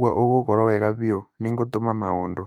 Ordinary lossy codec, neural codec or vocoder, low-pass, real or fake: none; autoencoder, 48 kHz, 32 numbers a frame, DAC-VAE, trained on Japanese speech; 19.8 kHz; fake